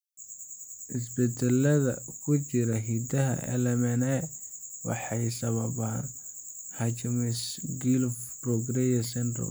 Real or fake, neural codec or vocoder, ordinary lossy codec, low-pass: real; none; none; none